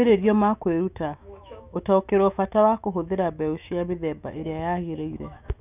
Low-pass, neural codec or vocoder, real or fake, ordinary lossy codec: 3.6 kHz; autoencoder, 48 kHz, 128 numbers a frame, DAC-VAE, trained on Japanese speech; fake; none